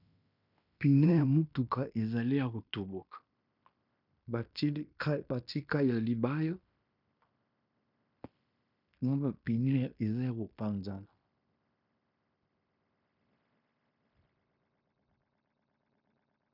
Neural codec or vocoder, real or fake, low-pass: codec, 16 kHz in and 24 kHz out, 0.9 kbps, LongCat-Audio-Codec, fine tuned four codebook decoder; fake; 5.4 kHz